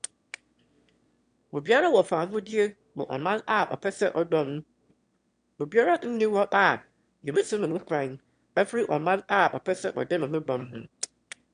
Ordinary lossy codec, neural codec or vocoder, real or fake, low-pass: MP3, 64 kbps; autoencoder, 22.05 kHz, a latent of 192 numbers a frame, VITS, trained on one speaker; fake; 9.9 kHz